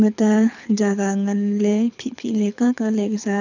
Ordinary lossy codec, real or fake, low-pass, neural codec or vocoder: none; fake; 7.2 kHz; codec, 24 kHz, 6 kbps, HILCodec